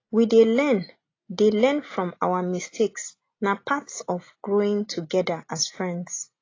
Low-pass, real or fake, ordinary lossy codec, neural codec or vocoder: 7.2 kHz; real; AAC, 32 kbps; none